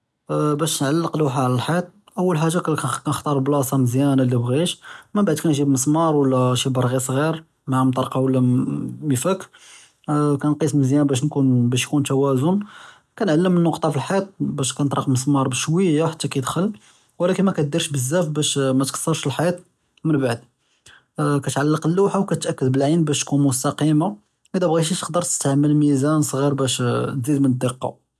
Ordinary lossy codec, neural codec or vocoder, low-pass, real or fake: none; none; none; real